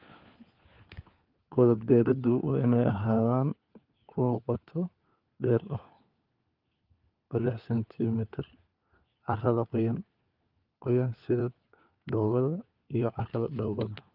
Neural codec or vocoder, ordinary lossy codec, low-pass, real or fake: codec, 16 kHz, 4 kbps, FunCodec, trained on LibriTTS, 50 frames a second; Opus, 24 kbps; 5.4 kHz; fake